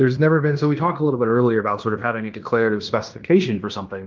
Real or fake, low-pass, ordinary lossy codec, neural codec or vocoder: fake; 7.2 kHz; Opus, 24 kbps; codec, 16 kHz in and 24 kHz out, 0.9 kbps, LongCat-Audio-Codec, fine tuned four codebook decoder